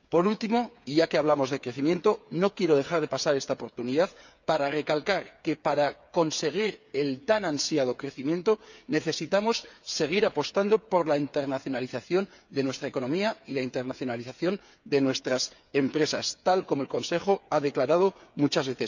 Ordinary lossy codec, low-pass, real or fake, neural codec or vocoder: none; 7.2 kHz; fake; codec, 16 kHz, 8 kbps, FreqCodec, smaller model